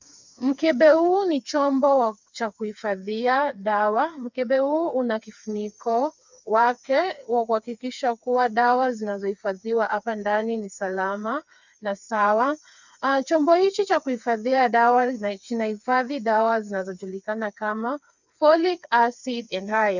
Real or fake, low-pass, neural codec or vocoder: fake; 7.2 kHz; codec, 16 kHz, 4 kbps, FreqCodec, smaller model